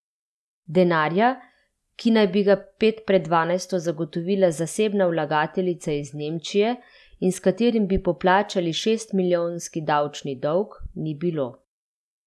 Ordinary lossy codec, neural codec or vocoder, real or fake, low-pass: none; none; real; none